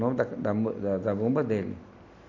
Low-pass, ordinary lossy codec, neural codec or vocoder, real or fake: 7.2 kHz; none; none; real